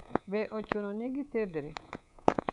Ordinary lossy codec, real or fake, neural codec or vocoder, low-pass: none; fake; codec, 24 kHz, 3.1 kbps, DualCodec; 10.8 kHz